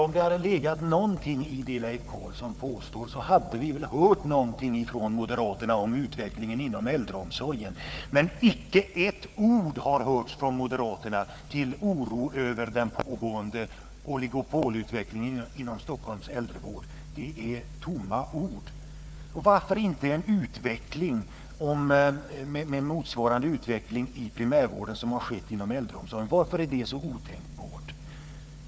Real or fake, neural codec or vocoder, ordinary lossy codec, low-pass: fake; codec, 16 kHz, 4 kbps, FunCodec, trained on Chinese and English, 50 frames a second; none; none